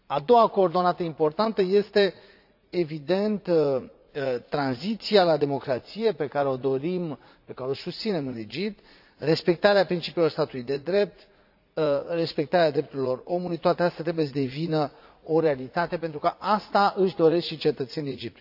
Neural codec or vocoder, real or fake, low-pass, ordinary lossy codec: vocoder, 44.1 kHz, 80 mel bands, Vocos; fake; 5.4 kHz; AAC, 48 kbps